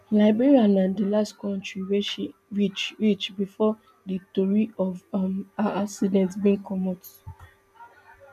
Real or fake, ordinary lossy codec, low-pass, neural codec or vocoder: real; none; 14.4 kHz; none